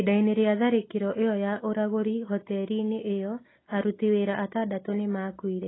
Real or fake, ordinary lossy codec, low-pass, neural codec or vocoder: real; AAC, 16 kbps; 7.2 kHz; none